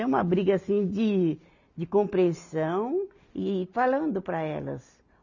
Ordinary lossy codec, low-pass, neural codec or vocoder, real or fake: none; 7.2 kHz; none; real